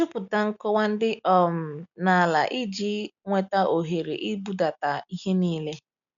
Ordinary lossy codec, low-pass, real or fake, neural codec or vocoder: none; 7.2 kHz; real; none